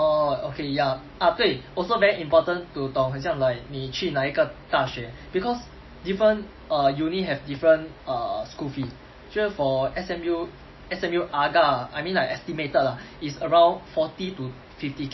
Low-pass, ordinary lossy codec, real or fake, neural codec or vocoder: 7.2 kHz; MP3, 24 kbps; real; none